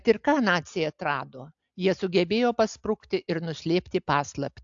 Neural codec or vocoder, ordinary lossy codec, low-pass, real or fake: none; AAC, 64 kbps; 7.2 kHz; real